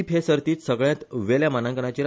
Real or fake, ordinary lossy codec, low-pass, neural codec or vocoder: real; none; none; none